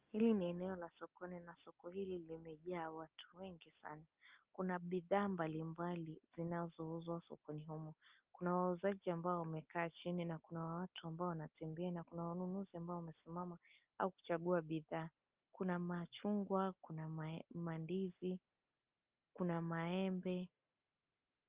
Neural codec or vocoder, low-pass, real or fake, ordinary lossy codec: none; 3.6 kHz; real; Opus, 32 kbps